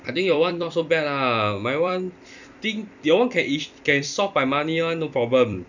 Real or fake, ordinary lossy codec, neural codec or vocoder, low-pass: fake; none; vocoder, 44.1 kHz, 128 mel bands every 256 samples, BigVGAN v2; 7.2 kHz